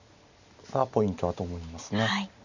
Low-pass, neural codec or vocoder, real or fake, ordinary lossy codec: 7.2 kHz; none; real; none